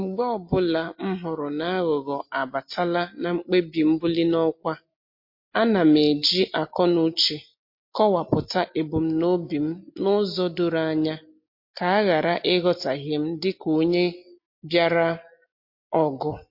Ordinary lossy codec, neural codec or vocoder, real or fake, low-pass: MP3, 32 kbps; none; real; 5.4 kHz